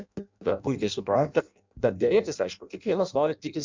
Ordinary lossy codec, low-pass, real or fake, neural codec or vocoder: MP3, 64 kbps; 7.2 kHz; fake; codec, 16 kHz in and 24 kHz out, 0.6 kbps, FireRedTTS-2 codec